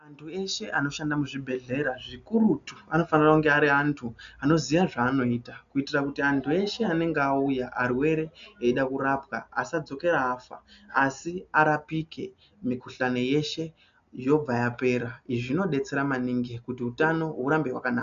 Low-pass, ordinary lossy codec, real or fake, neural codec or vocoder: 7.2 kHz; AAC, 96 kbps; real; none